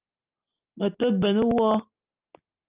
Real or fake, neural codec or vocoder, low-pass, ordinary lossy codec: real; none; 3.6 kHz; Opus, 24 kbps